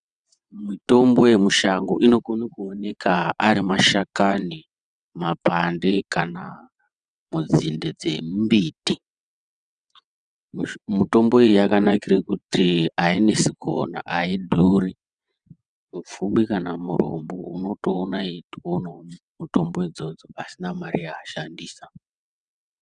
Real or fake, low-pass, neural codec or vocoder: fake; 9.9 kHz; vocoder, 22.05 kHz, 80 mel bands, WaveNeXt